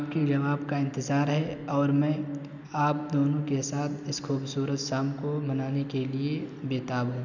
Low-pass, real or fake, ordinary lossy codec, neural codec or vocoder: 7.2 kHz; real; none; none